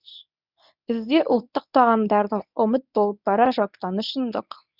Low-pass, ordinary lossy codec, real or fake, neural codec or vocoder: 5.4 kHz; none; fake; codec, 24 kHz, 0.9 kbps, WavTokenizer, medium speech release version 1